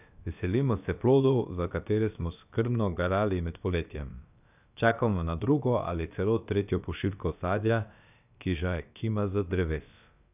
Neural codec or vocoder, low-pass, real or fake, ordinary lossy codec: codec, 16 kHz, about 1 kbps, DyCAST, with the encoder's durations; 3.6 kHz; fake; none